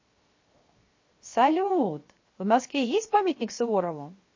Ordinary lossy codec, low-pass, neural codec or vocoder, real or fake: MP3, 32 kbps; 7.2 kHz; codec, 16 kHz, 0.7 kbps, FocalCodec; fake